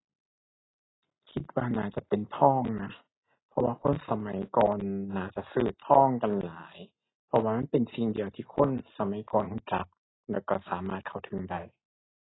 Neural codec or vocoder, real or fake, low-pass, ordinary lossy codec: none; real; 7.2 kHz; AAC, 16 kbps